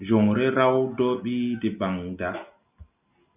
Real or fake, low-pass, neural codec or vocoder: real; 3.6 kHz; none